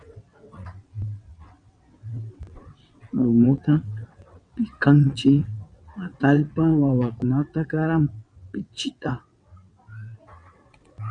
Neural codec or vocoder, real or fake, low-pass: vocoder, 22.05 kHz, 80 mel bands, WaveNeXt; fake; 9.9 kHz